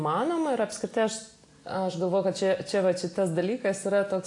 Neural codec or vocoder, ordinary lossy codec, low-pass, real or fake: none; AAC, 48 kbps; 10.8 kHz; real